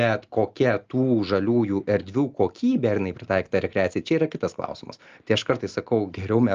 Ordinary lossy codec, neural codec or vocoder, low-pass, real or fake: Opus, 24 kbps; none; 7.2 kHz; real